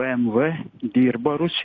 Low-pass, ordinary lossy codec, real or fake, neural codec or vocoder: 7.2 kHz; AAC, 32 kbps; real; none